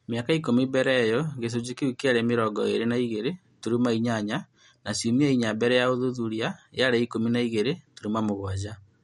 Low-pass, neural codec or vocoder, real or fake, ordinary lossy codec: 19.8 kHz; none; real; MP3, 48 kbps